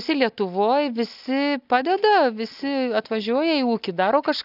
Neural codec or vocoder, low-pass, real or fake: none; 5.4 kHz; real